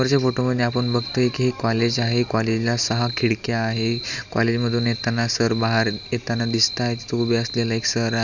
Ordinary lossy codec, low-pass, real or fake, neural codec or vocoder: none; 7.2 kHz; real; none